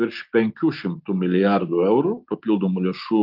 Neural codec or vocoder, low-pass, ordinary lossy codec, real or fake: none; 5.4 kHz; Opus, 32 kbps; real